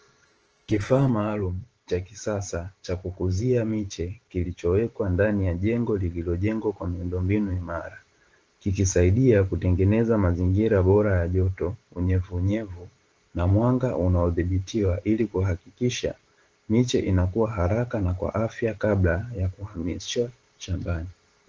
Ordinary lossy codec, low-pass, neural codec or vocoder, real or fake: Opus, 16 kbps; 7.2 kHz; vocoder, 44.1 kHz, 128 mel bands every 512 samples, BigVGAN v2; fake